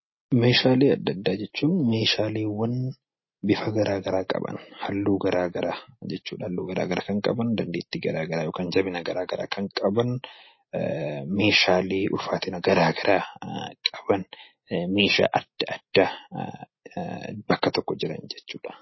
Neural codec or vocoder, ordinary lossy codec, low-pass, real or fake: none; MP3, 24 kbps; 7.2 kHz; real